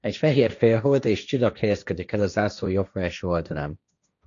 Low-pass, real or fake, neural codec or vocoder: 7.2 kHz; fake; codec, 16 kHz, 1.1 kbps, Voila-Tokenizer